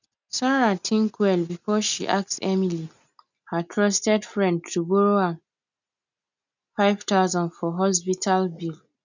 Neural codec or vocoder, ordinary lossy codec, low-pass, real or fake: none; none; 7.2 kHz; real